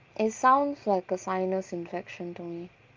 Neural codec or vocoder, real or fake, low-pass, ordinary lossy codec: none; real; 7.2 kHz; Opus, 32 kbps